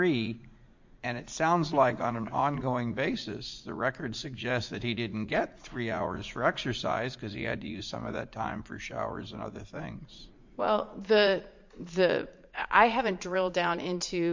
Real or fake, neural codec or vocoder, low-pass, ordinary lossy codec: fake; vocoder, 44.1 kHz, 80 mel bands, Vocos; 7.2 kHz; MP3, 48 kbps